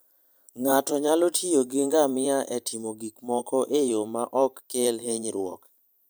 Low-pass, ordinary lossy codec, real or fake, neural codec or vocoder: none; none; fake; vocoder, 44.1 kHz, 128 mel bands every 512 samples, BigVGAN v2